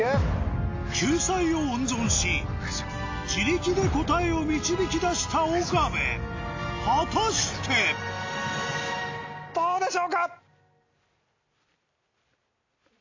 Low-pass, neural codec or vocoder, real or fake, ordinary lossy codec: 7.2 kHz; none; real; AAC, 48 kbps